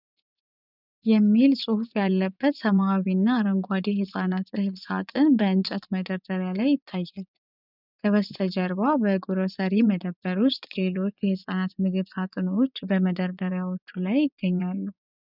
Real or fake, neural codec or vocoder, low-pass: fake; vocoder, 24 kHz, 100 mel bands, Vocos; 5.4 kHz